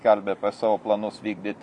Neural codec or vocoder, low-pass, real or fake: none; 10.8 kHz; real